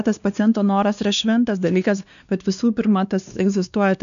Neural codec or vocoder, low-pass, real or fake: codec, 16 kHz, 2 kbps, X-Codec, WavLM features, trained on Multilingual LibriSpeech; 7.2 kHz; fake